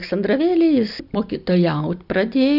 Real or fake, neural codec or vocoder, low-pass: real; none; 5.4 kHz